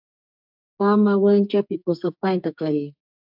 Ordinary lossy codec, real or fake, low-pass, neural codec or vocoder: AAC, 48 kbps; fake; 5.4 kHz; codec, 44.1 kHz, 2.6 kbps, SNAC